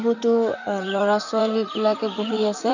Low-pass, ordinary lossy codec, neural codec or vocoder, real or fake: 7.2 kHz; none; vocoder, 44.1 kHz, 128 mel bands, Pupu-Vocoder; fake